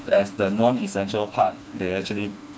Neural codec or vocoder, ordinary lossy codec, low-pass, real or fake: codec, 16 kHz, 2 kbps, FreqCodec, smaller model; none; none; fake